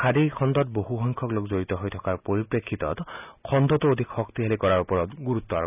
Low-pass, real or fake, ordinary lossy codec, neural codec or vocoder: 3.6 kHz; real; none; none